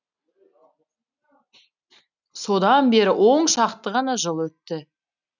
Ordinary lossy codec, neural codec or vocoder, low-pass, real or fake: none; none; 7.2 kHz; real